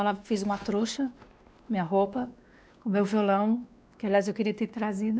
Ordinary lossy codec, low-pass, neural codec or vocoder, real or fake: none; none; codec, 16 kHz, 2 kbps, X-Codec, WavLM features, trained on Multilingual LibriSpeech; fake